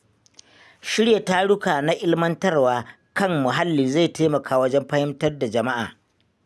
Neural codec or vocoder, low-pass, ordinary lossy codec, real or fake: none; none; none; real